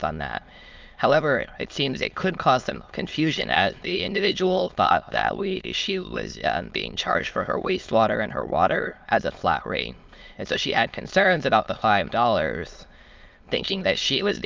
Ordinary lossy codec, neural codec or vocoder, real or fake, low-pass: Opus, 24 kbps; autoencoder, 22.05 kHz, a latent of 192 numbers a frame, VITS, trained on many speakers; fake; 7.2 kHz